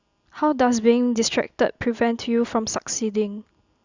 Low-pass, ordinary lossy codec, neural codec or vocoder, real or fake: 7.2 kHz; Opus, 64 kbps; none; real